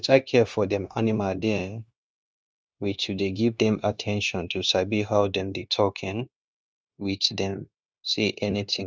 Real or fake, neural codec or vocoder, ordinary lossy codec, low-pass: fake; codec, 16 kHz, 0.9 kbps, LongCat-Audio-Codec; none; none